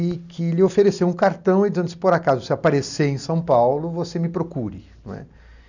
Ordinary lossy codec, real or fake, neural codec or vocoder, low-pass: none; real; none; 7.2 kHz